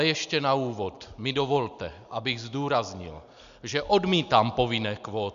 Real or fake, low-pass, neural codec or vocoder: real; 7.2 kHz; none